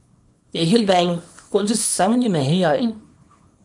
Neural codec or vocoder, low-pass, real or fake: codec, 24 kHz, 0.9 kbps, WavTokenizer, small release; 10.8 kHz; fake